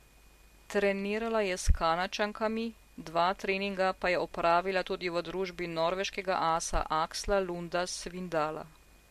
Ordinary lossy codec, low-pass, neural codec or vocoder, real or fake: MP3, 64 kbps; 14.4 kHz; none; real